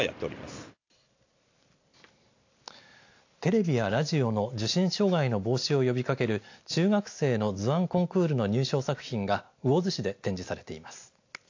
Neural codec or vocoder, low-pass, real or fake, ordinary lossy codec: none; 7.2 kHz; real; AAC, 48 kbps